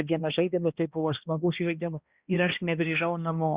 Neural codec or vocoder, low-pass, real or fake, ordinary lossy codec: codec, 16 kHz, 1 kbps, X-Codec, HuBERT features, trained on balanced general audio; 3.6 kHz; fake; Opus, 64 kbps